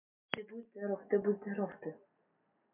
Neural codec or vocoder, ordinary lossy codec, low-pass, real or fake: none; MP3, 32 kbps; 3.6 kHz; real